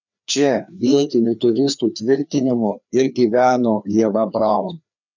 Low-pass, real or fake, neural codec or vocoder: 7.2 kHz; fake; codec, 16 kHz, 2 kbps, FreqCodec, larger model